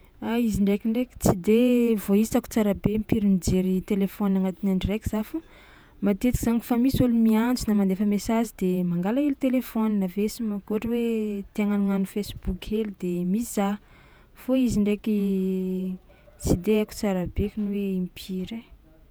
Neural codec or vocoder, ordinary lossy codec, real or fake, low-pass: vocoder, 48 kHz, 128 mel bands, Vocos; none; fake; none